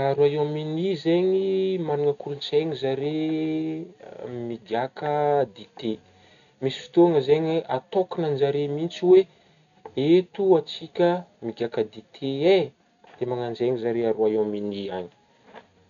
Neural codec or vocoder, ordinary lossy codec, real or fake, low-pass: none; none; real; 7.2 kHz